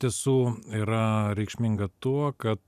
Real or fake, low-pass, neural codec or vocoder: real; 14.4 kHz; none